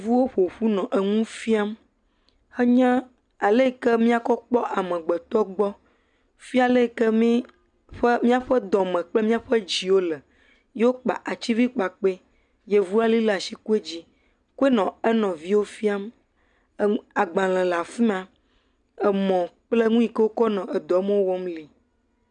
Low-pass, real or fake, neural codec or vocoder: 9.9 kHz; real; none